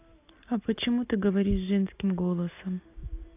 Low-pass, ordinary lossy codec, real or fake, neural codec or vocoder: 3.6 kHz; AAC, 24 kbps; real; none